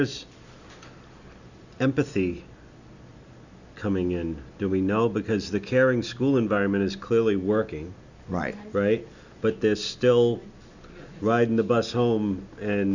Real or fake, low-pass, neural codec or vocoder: real; 7.2 kHz; none